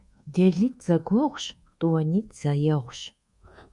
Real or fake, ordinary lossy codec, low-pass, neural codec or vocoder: fake; Opus, 64 kbps; 10.8 kHz; codec, 24 kHz, 1.2 kbps, DualCodec